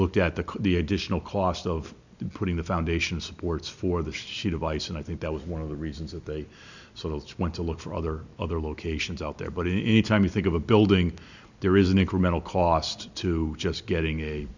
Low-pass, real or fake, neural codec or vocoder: 7.2 kHz; real; none